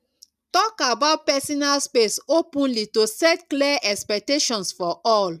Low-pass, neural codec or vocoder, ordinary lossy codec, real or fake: 14.4 kHz; none; none; real